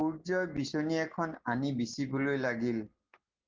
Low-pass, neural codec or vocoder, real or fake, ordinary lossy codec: 7.2 kHz; none; real; Opus, 16 kbps